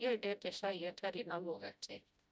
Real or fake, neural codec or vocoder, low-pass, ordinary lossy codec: fake; codec, 16 kHz, 0.5 kbps, FreqCodec, smaller model; none; none